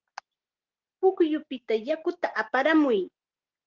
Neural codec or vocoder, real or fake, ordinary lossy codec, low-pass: none; real; Opus, 16 kbps; 7.2 kHz